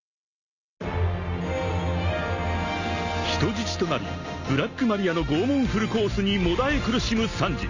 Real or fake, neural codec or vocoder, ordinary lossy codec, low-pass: real; none; none; 7.2 kHz